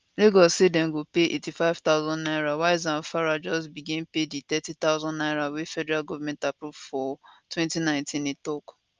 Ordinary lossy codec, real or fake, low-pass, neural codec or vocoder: Opus, 24 kbps; real; 7.2 kHz; none